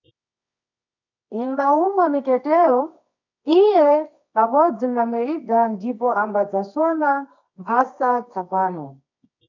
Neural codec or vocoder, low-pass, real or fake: codec, 24 kHz, 0.9 kbps, WavTokenizer, medium music audio release; 7.2 kHz; fake